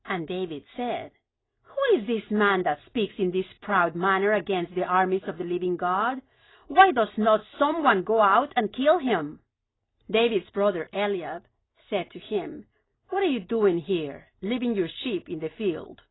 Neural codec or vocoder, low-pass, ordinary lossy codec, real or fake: none; 7.2 kHz; AAC, 16 kbps; real